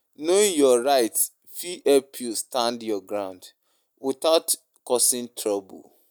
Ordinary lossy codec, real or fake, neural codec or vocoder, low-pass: none; real; none; none